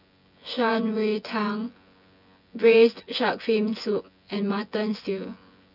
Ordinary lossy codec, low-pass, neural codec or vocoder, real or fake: none; 5.4 kHz; vocoder, 24 kHz, 100 mel bands, Vocos; fake